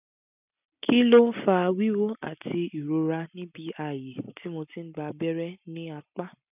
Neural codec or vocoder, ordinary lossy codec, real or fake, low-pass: none; none; real; 3.6 kHz